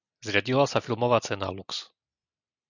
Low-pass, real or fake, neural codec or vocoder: 7.2 kHz; real; none